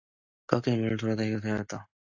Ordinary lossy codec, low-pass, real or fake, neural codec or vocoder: AAC, 48 kbps; 7.2 kHz; real; none